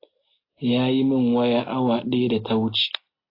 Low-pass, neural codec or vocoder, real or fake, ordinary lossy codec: 5.4 kHz; none; real; AAC, 24 kbps